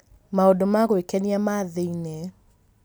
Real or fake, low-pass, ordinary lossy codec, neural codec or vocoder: real; none; none; none